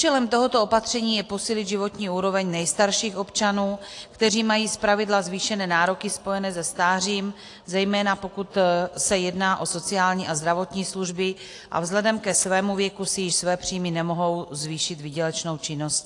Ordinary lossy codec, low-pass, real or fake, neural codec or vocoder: AAC, 48 kbps; 10.8 kHz; real; none